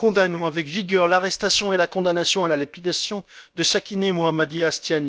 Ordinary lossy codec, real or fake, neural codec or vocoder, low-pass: none; fake; codec, 16 kHz, about 1 kbps, DyCAST, with the encoder's durations; none